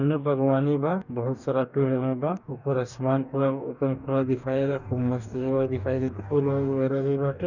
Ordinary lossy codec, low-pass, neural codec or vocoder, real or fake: none; 7.2 kHz; codec, 44.1 kHz, 2.6 kbps, DAC; fake